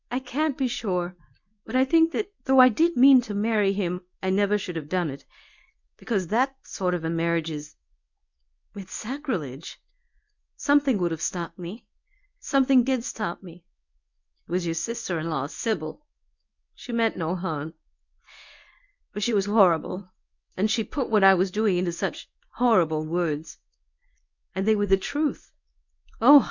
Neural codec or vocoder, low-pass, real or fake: codec, 24 kHz, 0.9 kbps, WavTokenizer, medium speech release version 1; 7.2 kHz; fake